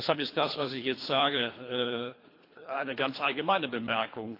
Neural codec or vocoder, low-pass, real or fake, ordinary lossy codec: codec, 24 kHz, 3 kbps, HILCodec; 5.4 kHz; fake; AAC, 32 kbps